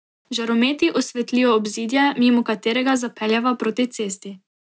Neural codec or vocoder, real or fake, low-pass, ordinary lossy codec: none; real; none; none